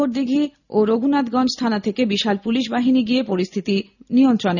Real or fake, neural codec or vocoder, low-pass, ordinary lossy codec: real; none; 7.2 kHz; none